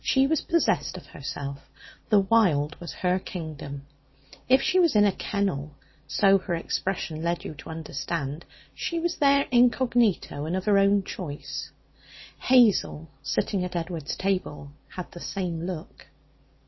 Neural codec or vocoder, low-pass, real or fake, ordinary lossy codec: none; 7.2 kHz; real; MP3, 24 kbps